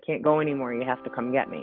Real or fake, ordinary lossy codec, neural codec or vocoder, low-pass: real; Opus, 32 kbps; none; 5.4 kHz